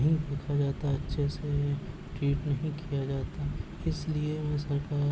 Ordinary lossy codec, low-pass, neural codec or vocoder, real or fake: none; none; none; real